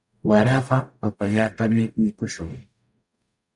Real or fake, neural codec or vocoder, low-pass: fake; codec, 44.1 kHz, 0.9 kbps, DAC; 10.8 kHz